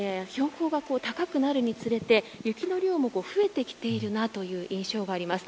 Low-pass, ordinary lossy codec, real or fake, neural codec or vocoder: none; none; real; none